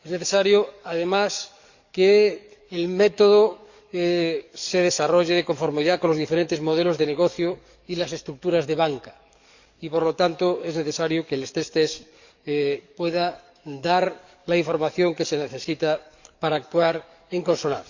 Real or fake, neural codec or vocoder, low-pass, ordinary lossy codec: fake; codec, 44.1 kHz, 7.8 kbps, DAC; 7.2 kHz; Opus, 64 kbps